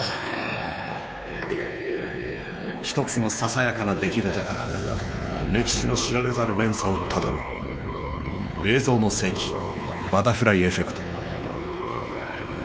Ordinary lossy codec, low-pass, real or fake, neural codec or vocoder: none; none; fake; codec, 16 kHz, 2 kbps, X-Codec, WavLM features, trained on Multilingual LibriSpeech